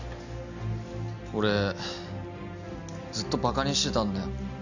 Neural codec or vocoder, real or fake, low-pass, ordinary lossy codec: none; real; 7.2 kHz; none